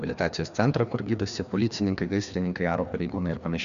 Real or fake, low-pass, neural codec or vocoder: fake; 7.2 kHz; codec, 16 kHz, 2 kbps, FreqCodec, larger model